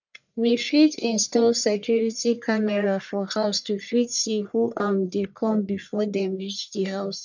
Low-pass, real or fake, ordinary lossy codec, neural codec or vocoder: 7.2 kHz; fake; none; codec, 44.1 kHz, 1.7 kbps, Pupu-Codec